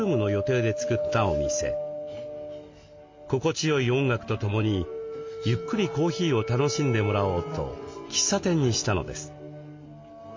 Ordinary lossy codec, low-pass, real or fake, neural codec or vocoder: MP3, 48 kbps; 7.2 kHz; real; none